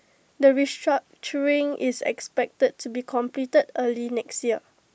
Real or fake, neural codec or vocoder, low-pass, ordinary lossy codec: real; none; none; none